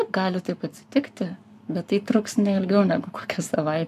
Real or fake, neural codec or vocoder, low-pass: fake; codec, 44.1 kHz, 7.8 kbps, Pupu-Codec; 14.4 kHz